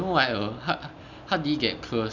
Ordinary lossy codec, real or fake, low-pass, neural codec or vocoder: none; real; 7.2 kHz; none